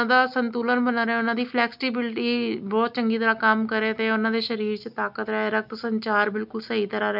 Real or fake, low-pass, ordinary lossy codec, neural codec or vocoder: real; 5.4 kHz; none; none